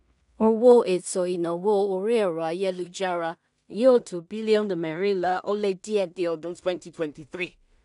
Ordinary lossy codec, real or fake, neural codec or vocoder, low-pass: none; fake; codec, 16 kHz in and 24 kHz out, 0.4 kbps, LongCat-Audio-Codec, two codebook decoder; 10.8 kHz